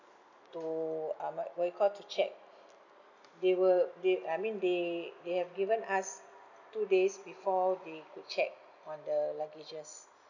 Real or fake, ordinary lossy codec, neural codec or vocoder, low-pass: real; none; none; 7.2 kHz